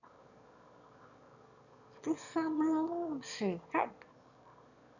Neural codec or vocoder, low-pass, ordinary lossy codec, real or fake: autoencoder, 22.05 kHz, a latent of 192 numbers a frame, VITS, trained on one speaker; 7.2 kHz; none; fake